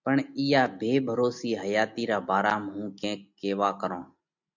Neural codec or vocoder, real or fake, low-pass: none; real; 7.2 kHz